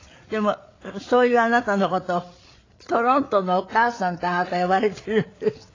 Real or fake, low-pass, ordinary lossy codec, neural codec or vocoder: fake; 7.2 kHz; AAC, 32 kbps; codec, 16 kHz, 8 kbps, FreqCodec, larger model